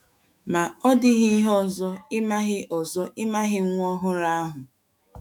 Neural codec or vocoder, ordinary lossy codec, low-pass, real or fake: autoencoder, 48 kHz, 128 numbers a frame, DAC-VAE, trained on Japanese speech; none; 19.8 kHz; fake